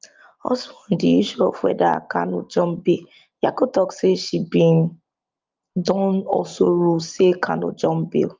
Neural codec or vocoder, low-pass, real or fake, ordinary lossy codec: none; 7.2 kHz; real; Opus, 24 kbps